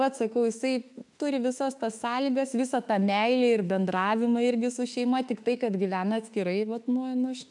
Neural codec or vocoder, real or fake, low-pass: autoencoder, 48 kHz, 32 numbers a frame, DAC-VAE, trained on Japanese speech; fake; 10.8 kHz